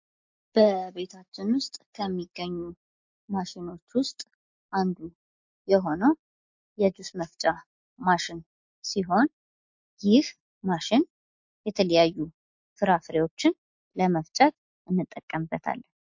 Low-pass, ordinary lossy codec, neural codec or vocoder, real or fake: 7.2 kHz; MP3, 48 kbps; none; real